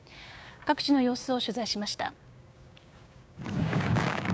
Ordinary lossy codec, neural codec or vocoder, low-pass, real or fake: none; codec, 16 kHz, 6 kbps, DAC; none; fake